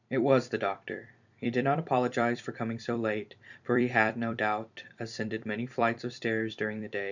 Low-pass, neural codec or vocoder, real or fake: 7.2 kHz; vocoder, 44.1 kHz, 128 mel bands every 256 samples, BigVGAN v2; fake